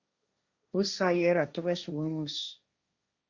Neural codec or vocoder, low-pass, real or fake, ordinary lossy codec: codec, 16 kHz, 1.1 kbps, Voila-Tokenizer; 7.2 kHz; fake; Opus, 64 kbps